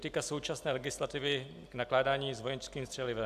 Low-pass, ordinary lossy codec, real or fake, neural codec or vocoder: 14.4 kHz; AAC, 96 kbps; real; none